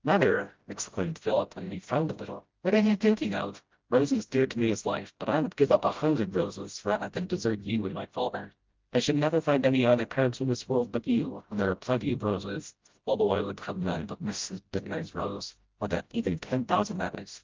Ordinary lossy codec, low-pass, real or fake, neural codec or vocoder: Opus, 32 kbps; 7.2 kHz; fake; codec, 16 kHz, 0.5 kbps, FreqCodec, smaller model